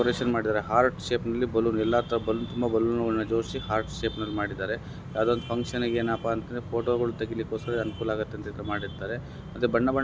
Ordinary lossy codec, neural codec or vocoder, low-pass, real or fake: none; none; none; real